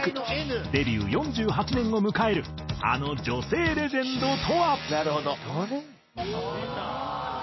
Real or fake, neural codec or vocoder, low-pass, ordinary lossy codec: real; none; 7.2 kHz; MP3, 24 kbps